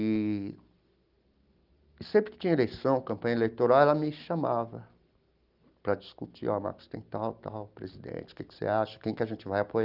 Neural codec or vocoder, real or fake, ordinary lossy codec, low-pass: none; real; Opus, 32 kbps; 5.4 kHz